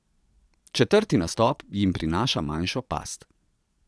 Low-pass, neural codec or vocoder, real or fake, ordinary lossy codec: none; vocoder, 22.05 kHz, 80 mel bands, Vocos; fake; none